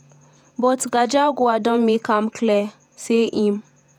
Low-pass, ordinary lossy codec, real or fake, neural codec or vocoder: 19.8 kHz; none; fake; vocoder, 48 kHz, 128 mel bands, Vocos